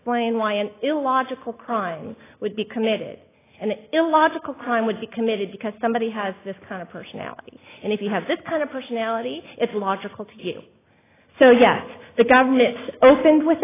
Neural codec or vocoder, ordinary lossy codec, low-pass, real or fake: none; AAC, 16 kbps; 3.6 kHz; real